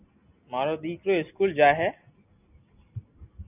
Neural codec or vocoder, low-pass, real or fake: none; 3.6 kHz; real